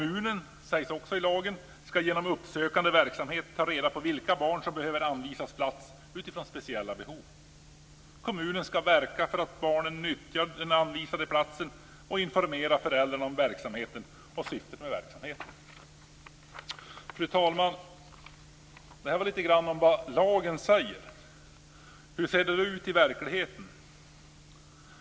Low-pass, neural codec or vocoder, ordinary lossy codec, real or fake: none; none; none; real